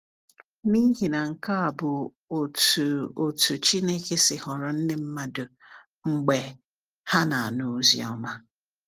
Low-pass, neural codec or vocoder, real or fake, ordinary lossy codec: 14.4 kHz; none; real; Opus, 24 kbps